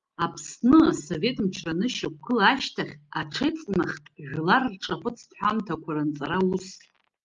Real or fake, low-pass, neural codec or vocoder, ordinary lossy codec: real; 7.2 kHz; none; Opus, 32 kbps